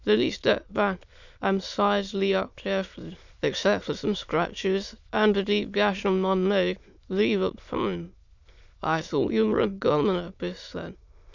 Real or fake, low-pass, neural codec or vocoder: fake; 7.2 kHz; autoencoder, 22.05 kHz, a latent of 192 numbers a frame, VITS, trained on many speakers